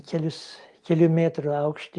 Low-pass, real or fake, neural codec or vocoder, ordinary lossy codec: 10.8 kHz; real; none; Opus, 32 kbps